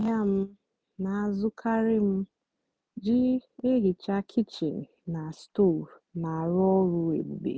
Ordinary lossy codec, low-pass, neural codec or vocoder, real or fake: none; none; none; real